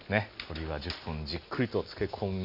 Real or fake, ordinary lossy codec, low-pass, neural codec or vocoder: real; none; 5.4 kHz; none